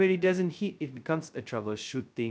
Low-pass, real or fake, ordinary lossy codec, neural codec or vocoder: none; fake; none; codec, 16 kHz, 0.2 kbps, FocalCodec